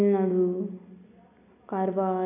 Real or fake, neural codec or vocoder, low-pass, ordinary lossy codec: real; none; 3.6 kHz; none